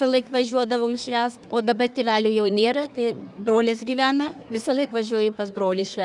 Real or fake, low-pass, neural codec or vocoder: fake; 10.8 kHz; codec, 24 kHz, 1 kbps, SNAC